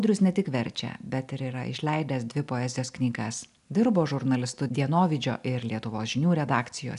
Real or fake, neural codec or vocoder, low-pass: real; none; 10.8 kHz